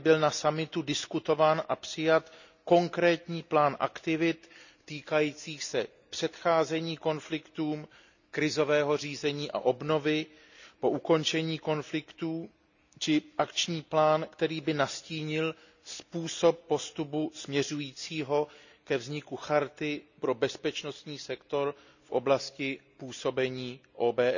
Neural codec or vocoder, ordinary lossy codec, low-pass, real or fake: none; none; 7.2 kHz; real